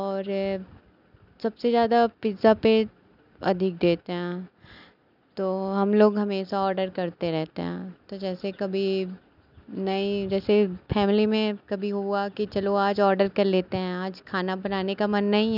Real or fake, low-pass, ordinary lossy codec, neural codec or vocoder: real; 5.4 kHz; none; none